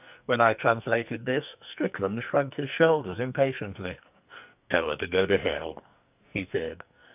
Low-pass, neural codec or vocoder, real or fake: 3.6 kHz; codec, 44.1 kHz, 2.6 kbps, SNAC; fake